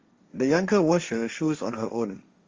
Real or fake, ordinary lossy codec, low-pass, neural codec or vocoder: fake; Opus, 32 kbps; 7.2 kHz; codec, 16 kHz, 1.1 kbps, Voila-Tokenizer